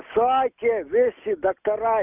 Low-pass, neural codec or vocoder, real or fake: 3.6 kHz; none; real